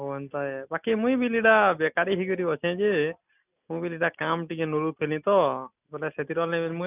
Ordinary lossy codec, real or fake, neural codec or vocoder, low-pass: none; real; none; 3.6 kHz